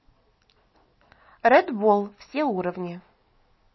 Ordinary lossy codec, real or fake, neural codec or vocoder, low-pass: MP3, 24 kbps; real; none; 7.2 kHz